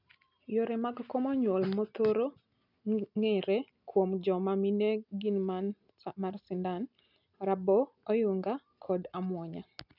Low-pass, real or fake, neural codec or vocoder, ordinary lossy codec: 5.4 kHz; real; none; none